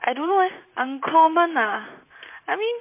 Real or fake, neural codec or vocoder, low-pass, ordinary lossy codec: fake; vocoder, 44.1 kHz, 128 mel bands, Pupu-Vocoder; 3.6 kHz; MP3, 24 kbps